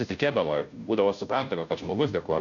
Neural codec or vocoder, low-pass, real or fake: codec, 16 kHz, 0.5 kbps, FunCodec, trained on Chinese and English, 25 frames a second; 7.2 kHz; fake